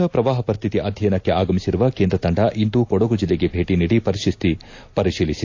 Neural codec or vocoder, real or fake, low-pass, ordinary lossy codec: none; real; 7.2 kHz; AAC, 48 kbps